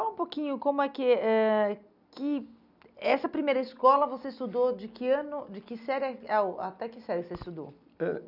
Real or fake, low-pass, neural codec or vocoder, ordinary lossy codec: real; 5.4 kHz; none; none